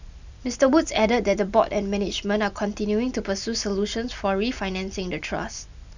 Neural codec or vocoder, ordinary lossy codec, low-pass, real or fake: none; none; 7.2 kHz; real